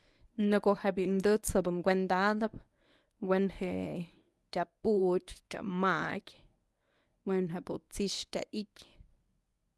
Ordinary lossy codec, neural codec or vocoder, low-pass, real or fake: none; codec, 24 kHz, 0.9 kbps, WavTokenizer, medium speech release version 1; none; fake